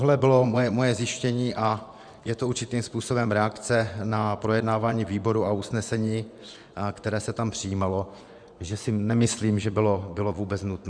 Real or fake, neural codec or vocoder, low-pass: fake; vocoder, 24 kHz, 100 mel bands, Vocos; 9.9 kHz